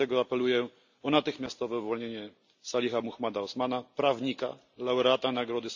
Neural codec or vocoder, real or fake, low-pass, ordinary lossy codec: none; real; 7.2 kHz; none